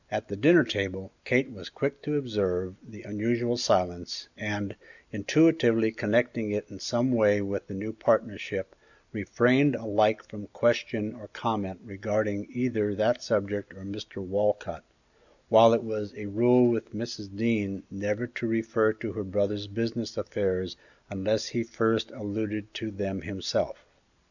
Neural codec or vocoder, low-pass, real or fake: none; 7.2 kHz; real